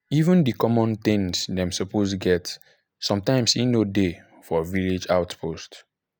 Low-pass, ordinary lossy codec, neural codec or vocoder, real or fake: none; none; none; real